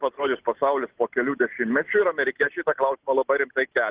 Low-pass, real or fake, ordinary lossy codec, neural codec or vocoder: 3.6 kHz; real; Opus, 16 kbps; none